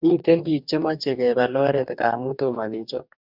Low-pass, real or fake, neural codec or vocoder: 5.4 kHz; fake; codec, 44.1 kHz, 2.6 kbps, DAC